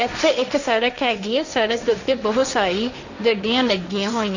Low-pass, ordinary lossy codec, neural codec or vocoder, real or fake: 7.2 kHz; none; codec, 16 kHz, 1.1 kbps, Voila-Tokenizer; fake